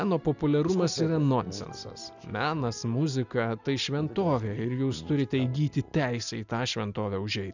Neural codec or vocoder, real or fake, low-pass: none; real; 7.2 kHz